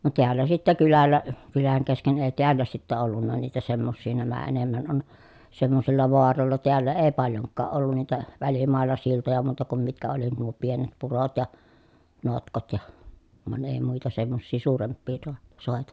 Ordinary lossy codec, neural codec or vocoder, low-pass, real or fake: none; none; none; real